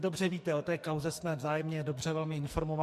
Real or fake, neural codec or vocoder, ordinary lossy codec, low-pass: fake; codec, 44.1 kHz, 2.6 kbps, SNAC; MP3, 64 kbps; 14.4 kHz